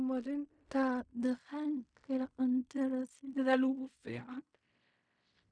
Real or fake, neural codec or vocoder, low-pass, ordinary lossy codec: fake; codec, 16 kHz in and 24 kHz out, 0.4 kbps, LongCat-Audio-Codec, fine tuned four codebook decoder; 9.9 kHz; none